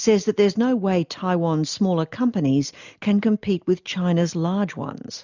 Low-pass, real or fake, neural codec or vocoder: 7.2 kHz; real; none